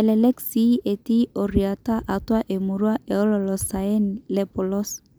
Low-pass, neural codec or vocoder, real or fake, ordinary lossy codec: none; none; real; none